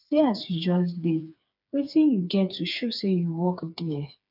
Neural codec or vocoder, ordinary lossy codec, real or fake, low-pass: codec, 16 kHz, 4 kbps, FreqCodec, smaller model; none; fake; 5.4 kHz